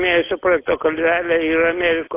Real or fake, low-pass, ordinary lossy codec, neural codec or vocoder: real; 3.6 kHz; AAC, 24 kbps; none